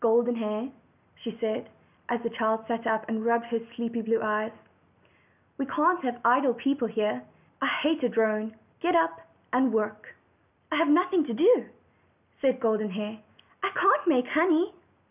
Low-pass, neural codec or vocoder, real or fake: 3.6 kHz; none; real